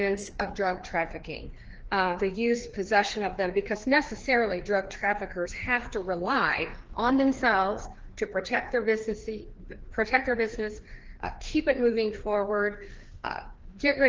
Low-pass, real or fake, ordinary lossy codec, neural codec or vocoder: 7.2 kHz; fake; Opus, 16 kbps; codec, 16 kHz, 2 kbps, FreqCodec, larger model